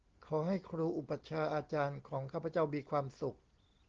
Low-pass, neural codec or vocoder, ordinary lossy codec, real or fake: 7.2 kHz; none; Opus, 16 kbps; real